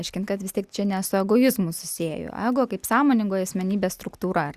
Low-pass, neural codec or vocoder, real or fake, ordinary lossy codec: 14.4 kHz; none; real; Opus, 64 kbps